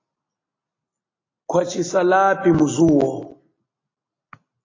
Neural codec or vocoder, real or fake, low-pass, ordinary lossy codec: none; real; 7.2 kHz; AAC, 32 kbps